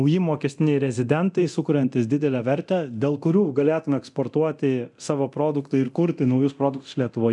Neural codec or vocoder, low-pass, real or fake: codec, 24 kHz, 0.9 kbps, DualCodec; 10.8 kHz; fake